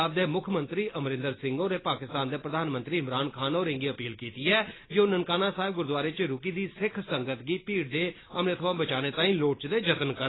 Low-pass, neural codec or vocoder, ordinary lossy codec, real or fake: 7.2 kHz; none; AAC, 16 kbps; real